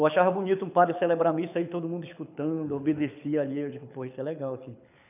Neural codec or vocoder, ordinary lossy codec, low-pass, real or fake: codec, 24 kHz, 6 kbps, HILCodec; none; 3.6 kHz; fake